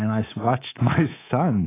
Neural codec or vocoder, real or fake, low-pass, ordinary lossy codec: codec, 24 kHz, 3.1 kbps, DualCodec; fake; 3.6 kHz; AAC, 16 kbps